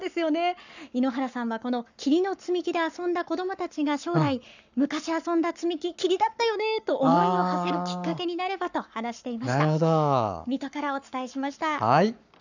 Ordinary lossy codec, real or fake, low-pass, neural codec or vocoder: none; fake; 7.2 kHz; codec, 44.1 kHz, 7.8 kbps, Pupu-Codec